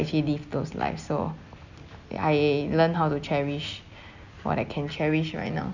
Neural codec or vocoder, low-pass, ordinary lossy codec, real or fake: none; 7.2 kHz; none; real